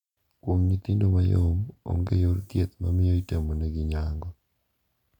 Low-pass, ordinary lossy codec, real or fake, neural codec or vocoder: 19.8 kHz; none; real; none